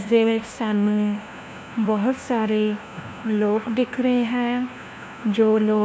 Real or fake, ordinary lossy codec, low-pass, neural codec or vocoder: fake; none; none; codec, 16 kHz, 1 kbps, FunCodec, trained on LibriTTS, 50 frames a second